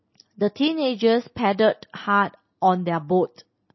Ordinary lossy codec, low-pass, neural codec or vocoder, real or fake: MP3, 24 kbps; 7.2 kHz; none; real